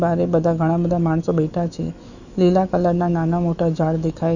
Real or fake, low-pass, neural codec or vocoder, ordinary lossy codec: fake; 7.2 kHz; autoencoder, 48 kHz, 128 numbers a frame, DAC-VAE, trained on Japanese speech; none